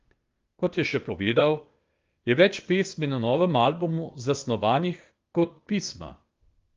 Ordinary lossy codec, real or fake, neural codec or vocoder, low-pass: Opus, 32 kbps; fake; codec, 16 kHz, 0.8 kbps, ZipCodec; 7.2 kHz